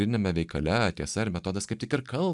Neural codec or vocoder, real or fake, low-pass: none; real; 10.8 kHz